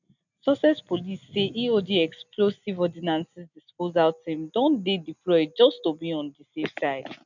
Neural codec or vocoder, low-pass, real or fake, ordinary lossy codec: none; 7.2 kHz; real; none